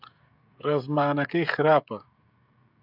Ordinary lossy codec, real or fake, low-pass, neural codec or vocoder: AAC, 48 kbps; fake; 5.4 kHz; codec, 16 kHz, 16 kbps, FreqCodec, smaller model